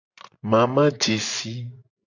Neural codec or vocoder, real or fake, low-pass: vocoder, 24 kHz, 100 mel bands, Vocos; fake; 7.2 kHz